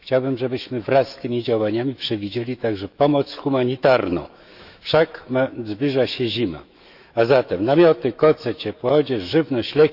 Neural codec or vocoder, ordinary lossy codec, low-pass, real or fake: autoencoder, 48 kHz, 128 numbers a frame, DAC-VAE, trained on Japanese speech; AAC, 48 kbps; 5.4 kHz; fake